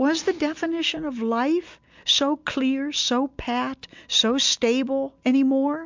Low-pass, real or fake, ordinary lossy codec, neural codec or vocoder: 7.2 kHz; real; MP3, 64 kbps; none